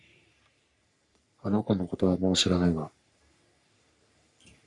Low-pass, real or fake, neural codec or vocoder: 10.8 kHz; fake; codec, 44.1 kHz, 3.4 kbps, Pupu-Codec